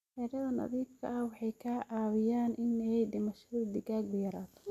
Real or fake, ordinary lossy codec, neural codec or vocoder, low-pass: real; none; none; 14.4 kHz